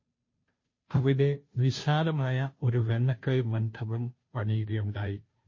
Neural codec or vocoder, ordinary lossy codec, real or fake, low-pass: codec, 16 kHz, 0.5 kbps, FunCodec, trained on Chinese and English, 25 frames a second; MP3, 32 kbps; fake; 7.2 kHz